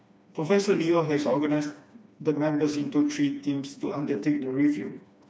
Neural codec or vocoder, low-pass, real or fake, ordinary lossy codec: codec, 16 kHz, 2 kbps, FreqCodec, smaller model; none; fake; none